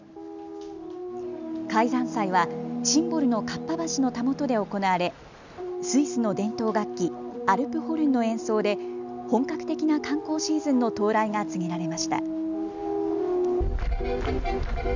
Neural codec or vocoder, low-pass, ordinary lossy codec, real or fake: none; 7.2 kHz; none; real